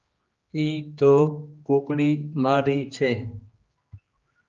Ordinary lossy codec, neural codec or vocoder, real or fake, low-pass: Opus, 32 kbps; codec, 16 kHz, 2 kbps, X-Codec, HuBERT features, trained on general audio; fake; 7.2 kHz